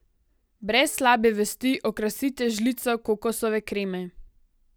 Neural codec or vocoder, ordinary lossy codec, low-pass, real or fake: none; none; none; real